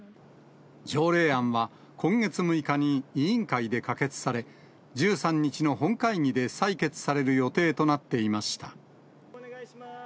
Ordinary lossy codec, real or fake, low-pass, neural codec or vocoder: none; real; none; none